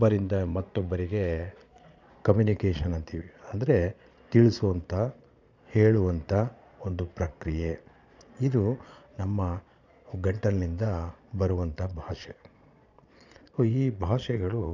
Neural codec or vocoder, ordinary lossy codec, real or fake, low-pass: vocoder, 22.05 kHz, 80 mel bands, Vocos; none; fake; 7.2 kHz